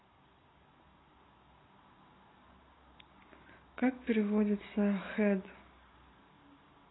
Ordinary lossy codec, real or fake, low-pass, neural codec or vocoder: AAC, 16 kbps; real; 7.2 kHz; none